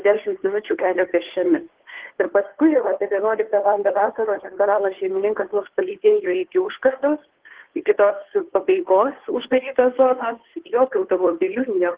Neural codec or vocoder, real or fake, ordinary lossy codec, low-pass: codec, 16 kHz, 2 kbps, FunCodec, trained on Chinese and English, 25 frames a second; fake; Opus, 16 kbps; 3.6 kHz